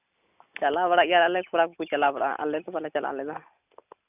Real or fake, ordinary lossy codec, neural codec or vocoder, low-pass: real; none; none; 3.6 kHz